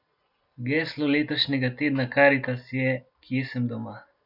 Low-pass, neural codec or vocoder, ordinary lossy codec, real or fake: 5.4 kHz; none; none; real